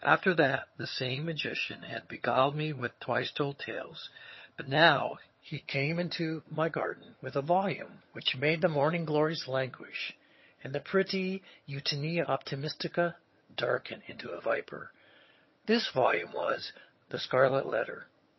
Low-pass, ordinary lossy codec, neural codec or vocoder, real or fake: 7.2 kHz; MP3, 24 kbps; vocoder, 22.05 kHz, 80 mel bands, HiFi-GAN; fake